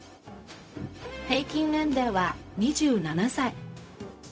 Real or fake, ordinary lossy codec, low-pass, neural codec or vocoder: fake; none; none; codec, 16 kHz, 0.4 kbps, LongCat-Audio-Codec